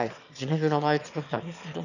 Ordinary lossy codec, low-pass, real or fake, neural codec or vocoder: none; 7.2 kHz; fake; autoencoder, 22.05 kHz, a latent of 192 numbers a frame, VITS, trained on one speaker